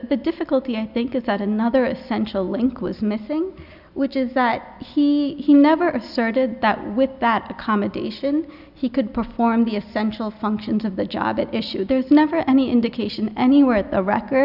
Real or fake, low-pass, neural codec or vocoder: real; 5.4 kHz; none